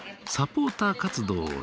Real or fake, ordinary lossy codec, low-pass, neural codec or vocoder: real; none; none; none